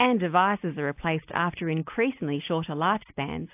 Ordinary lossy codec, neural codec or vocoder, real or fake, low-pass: AAC, 32 kbps; none; real; 3.6 kHz